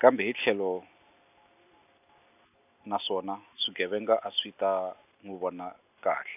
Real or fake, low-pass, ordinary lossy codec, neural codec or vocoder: real; 3.6 kHz; none; none